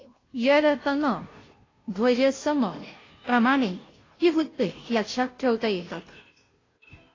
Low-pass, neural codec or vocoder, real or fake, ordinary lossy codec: 7.2 kHz; codec, 16 kHz, 0.5 kbps, FunCodec, trained on Chinese and English, 25 frames a second; fake; AAC, 32 kbps